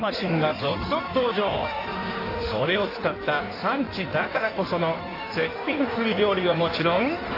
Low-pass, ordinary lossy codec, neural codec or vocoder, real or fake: 5.4 kHz; AAC, 32 kbps; codec, 16 kHz in and 24 kHz out, 1.1 kbps, FireRedTTS-2 codec; fake